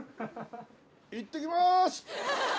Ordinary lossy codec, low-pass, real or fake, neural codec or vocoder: none; none; real; none